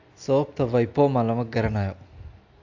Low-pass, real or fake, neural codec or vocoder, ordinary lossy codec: 7.2 kHz; real; none; AAC, 48 kbps